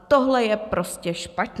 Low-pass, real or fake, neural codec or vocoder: 14.4 kHz; real; none